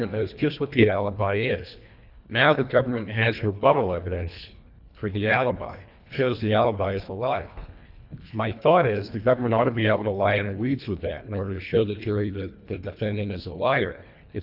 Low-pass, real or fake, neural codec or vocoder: 5.4 kHz; fake; codec, 24 kHz, 1.5 kbps, HILCodec